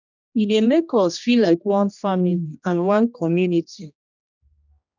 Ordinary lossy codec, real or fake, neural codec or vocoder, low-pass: none; fake; codec, 16 kHz, 1 kbps, X-Codec, HuBERT features, trained on general audio; 7.2 kHz